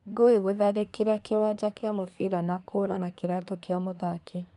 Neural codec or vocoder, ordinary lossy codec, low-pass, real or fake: codec, 24 kHz, 1 kbps, SNAC; none; 10.8 kHz; fake